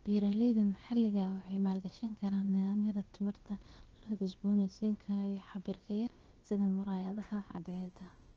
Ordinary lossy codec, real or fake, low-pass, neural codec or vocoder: Opus, 16 kbps; fake; 7.2 kHz; codec, 16 kHz, about 1 kbps, DyCAST, with the encoder's durations